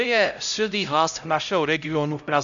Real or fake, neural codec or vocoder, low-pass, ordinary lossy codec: fake; codec, 16 kHz, 0.5 kbps, X-Codec, HuBERT features, trained on LibriSpeech; 7.2 kHz; MP3, 96 kbps